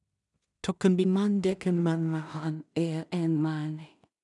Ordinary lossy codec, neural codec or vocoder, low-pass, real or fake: none; codec, 16 kHz in and 24 kHz out, 0.4 kbps, LongCat-Audio-Codec, two codebook decoder; 10.8 kHz; fake